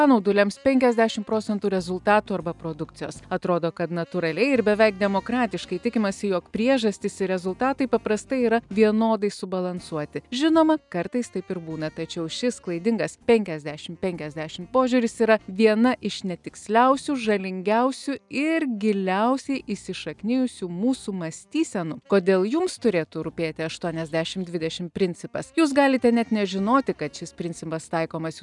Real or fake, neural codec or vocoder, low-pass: real; none; 10.8 kHz